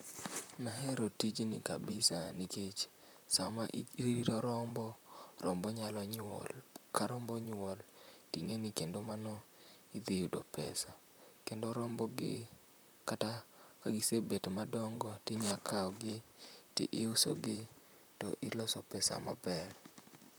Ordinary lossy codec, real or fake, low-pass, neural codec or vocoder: none; fake; none; vocoder, 44.1 kHz, 128 mel bands, Pupu-Vocoder